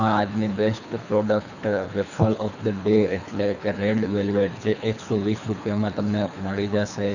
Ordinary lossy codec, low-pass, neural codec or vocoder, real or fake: none; 7.2 kHz; codec, 24 kHz, 3 kbps, HILCodec; fake